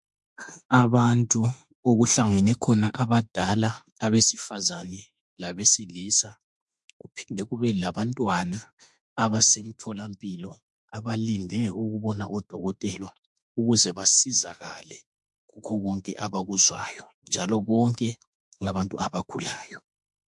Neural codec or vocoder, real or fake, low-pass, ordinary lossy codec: autoencoder, 48 kHz, 32 numbers a frame, DAC-VAE, trained on Japanese speech; fake; 10.8 kHz; MP3, 64 kbps